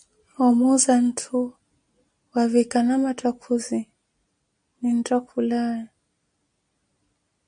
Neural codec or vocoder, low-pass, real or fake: none; 9.9 kHz; real